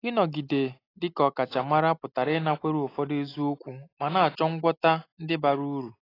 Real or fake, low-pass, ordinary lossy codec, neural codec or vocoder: real; 5.4 kHz; AAC, 24 kbps; none